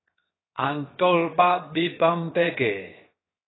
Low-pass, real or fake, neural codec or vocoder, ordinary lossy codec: 7.2 kHz; fake; codec, 16 kHz, 0.7 kbps, FocalCodec; AAC, 16 kbps